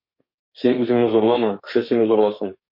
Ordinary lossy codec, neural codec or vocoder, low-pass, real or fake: MP3, 32 kbps; codec, 32 kHz, 1.9 kbps, SNAC; 5.4 kHz; fake